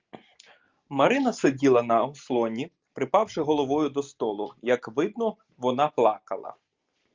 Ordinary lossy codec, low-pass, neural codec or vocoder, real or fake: Opus, 24 kbps; 7.2 kHz; none; real